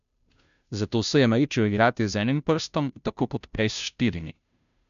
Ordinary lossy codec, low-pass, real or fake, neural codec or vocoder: none; 7.2 kHz; fake; codec, 16 kHz, 0.5 kbps, FunCodec, trained on Chinese and English, 25 frames a second